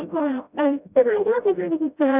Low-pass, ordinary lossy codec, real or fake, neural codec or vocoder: 3.6 kHz; none; fake; codec, 16 kHz, 0.5 kbps, FreqCodec, smaller model